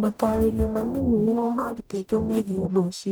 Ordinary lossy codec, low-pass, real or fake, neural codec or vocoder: none; none; fake; codec, 44.1 kHz, 0.9 kbps, DAC